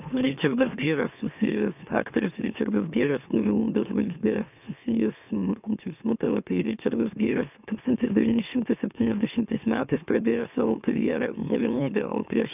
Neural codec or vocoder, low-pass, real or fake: autoencoder, 44.1 kHz, a latent of 192 numbers a frame, MeloTTS; 3.6 kHz; fake